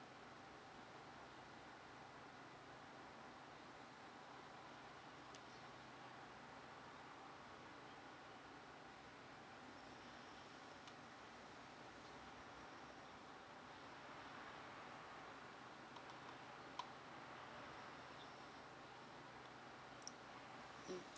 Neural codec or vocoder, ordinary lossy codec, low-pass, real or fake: none; none; none; real